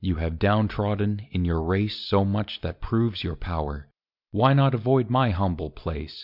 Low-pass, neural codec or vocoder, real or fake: 5.4 kHz; none; real